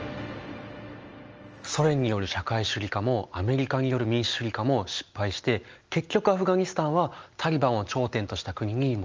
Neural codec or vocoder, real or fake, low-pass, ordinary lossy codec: none; real; 7.2 kHz; Opus, 24 kbps